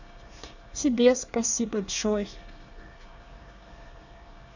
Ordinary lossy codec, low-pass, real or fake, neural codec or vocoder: none; 7.2 kHz; fake; codec, 24 kHz, 1 kbps, SNAC